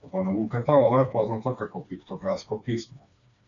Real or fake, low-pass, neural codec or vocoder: fake; 7.2 kHz; codec, 16 kHz, 2 kbps, FreqCodec, smaller model